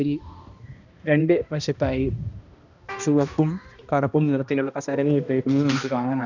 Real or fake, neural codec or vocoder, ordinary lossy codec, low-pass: fake; codec, 16 kHz, 1 kbps, X-Codec, HuBERT features, trained on balanced general audio; none; 7.2 kHz